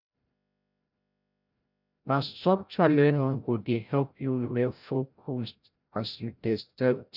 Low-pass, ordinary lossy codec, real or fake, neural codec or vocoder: 5.4 kHz; none; fake; codec, 16 kHz, 0.5 kbps, FreqCodec, larger model